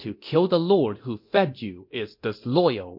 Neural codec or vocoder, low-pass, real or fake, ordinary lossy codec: codec, 24 kHz, 0.9 kbps, DualCodec; 5.4 kHz; fake; MP3, 32 kbps